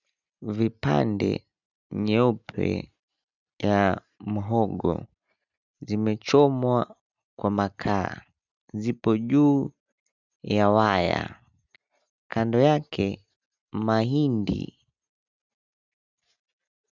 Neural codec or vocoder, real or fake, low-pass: none; real; 7.2 kHz